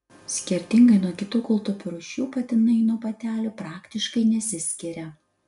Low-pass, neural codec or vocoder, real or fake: 10.8 kHz; none; real